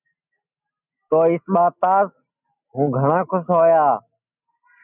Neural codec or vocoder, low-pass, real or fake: none; 3.6 kHz; real